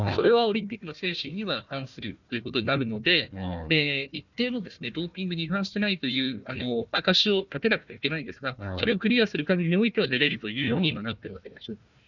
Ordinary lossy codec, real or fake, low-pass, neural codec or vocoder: none; fake; 7.2 kHz; codec, 16 kHz, 1 kbps, FunCodec, trained on Chinese and English, 50 frames a second